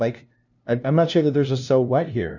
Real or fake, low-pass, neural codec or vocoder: fake; 7.2 kHz; codec, 16 kHz, 0.5 kbps, FunCodec, trained on LibriTTS, 25 frames a second